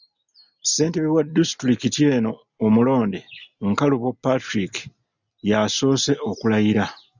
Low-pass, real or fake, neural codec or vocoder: 7.2 kHz; real; none